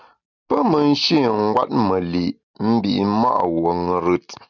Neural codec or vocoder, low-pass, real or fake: none; 7.2 kHz; real